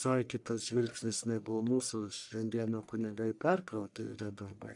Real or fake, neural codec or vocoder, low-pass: fake; codec, 44.1 kHz, 1.7 kbps, Pupu-Codec; 10.8 kHz